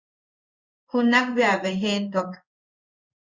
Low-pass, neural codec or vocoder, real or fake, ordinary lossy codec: 7.2 kHz; codec, 16 kHz in and 24 kHz out, 1 kbps, XY-Tokenizer; fake; Opus, 64 kbps